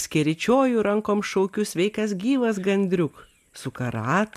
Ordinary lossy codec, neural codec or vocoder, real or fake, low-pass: MP3, 96 kbps; none; real; 14.4 kHz